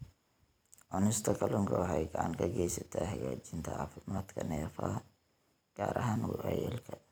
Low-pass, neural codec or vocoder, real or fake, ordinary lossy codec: none; vocoder, 44.1 kHz, 128 mel bands every 256 samples, BigVGAN v2; fake; none